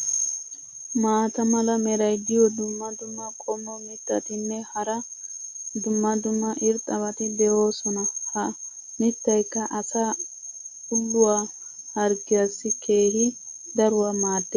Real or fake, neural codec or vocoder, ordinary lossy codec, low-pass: real; none; MP3, 48 kbps; 7.2 kHz